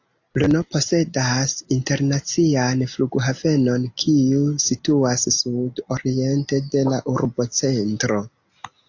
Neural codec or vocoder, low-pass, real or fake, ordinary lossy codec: none; 7.2 kHz; real; AAC, 48 kbps